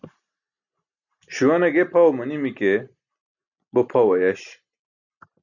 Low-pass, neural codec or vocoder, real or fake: 7.2 kHz; none; real